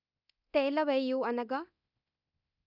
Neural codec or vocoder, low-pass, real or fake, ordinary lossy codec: codec, 24 kHz, 0.9 kbps, DualCodec; 5.4 kHz; fake; none